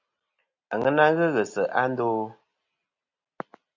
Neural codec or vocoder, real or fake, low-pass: none; real; 7.2 kHz